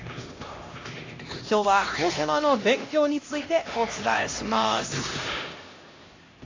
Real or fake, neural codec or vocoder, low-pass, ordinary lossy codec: fake; codec, 16 kHz, 1 kbps, X-Codec, HuBERT features, trained on LibriSpeech; 7.2 kHz; AAC, 32 kbps